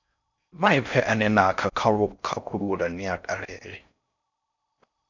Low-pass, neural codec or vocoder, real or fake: 7.2 kHz; codec, 16 kHz in and 24 kHz out, 0.6 kbps, FocalCodec, streaming, 4096 codes; fake